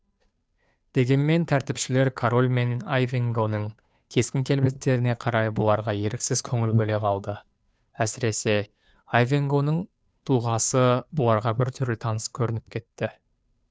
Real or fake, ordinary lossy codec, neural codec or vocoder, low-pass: fake; none; codec, 16 kHz, 2 kbps, FunCodec, trained on Chinese and English, 25 frames a second; none